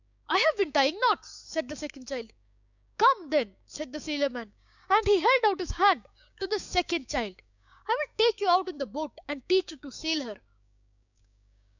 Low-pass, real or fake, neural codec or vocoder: 7.2 kHz; fake; codec, 16 kHz, 6 kbps, DAC